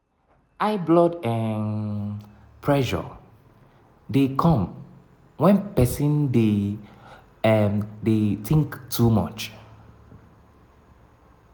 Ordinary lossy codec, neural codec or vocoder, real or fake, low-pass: none; none; real; none